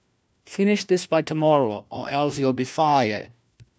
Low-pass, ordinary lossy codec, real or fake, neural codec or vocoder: none; none; fake; codec, 16 kHz, 1 kbps, FunCodec, trained on LibriTTS, 50 frames a second